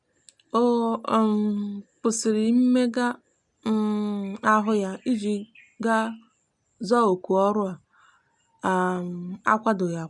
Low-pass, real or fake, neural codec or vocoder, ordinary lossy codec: 10.8 kHz; real; none; none